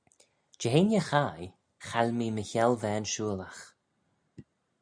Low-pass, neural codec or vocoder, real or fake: 9.9 kHz; none; real